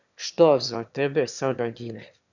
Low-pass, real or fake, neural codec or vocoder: 7.2 kHz; fake; autoencoder, 22.05 kHz, a latent of 192 numbers a frame, VITS, trained on one speaker